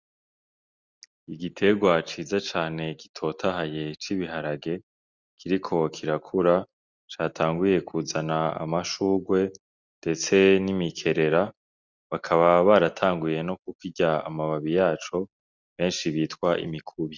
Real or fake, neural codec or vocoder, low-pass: real; none; 7.2 kHz